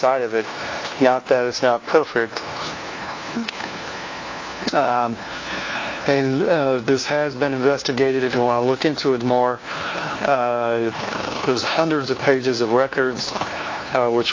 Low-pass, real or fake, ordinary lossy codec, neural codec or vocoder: 7.2 kHz; fake; AAC, 32 kbps; codec, 16 kHz, 1 kbps, FunCodec, trained on LibriTTS, 50 frames a second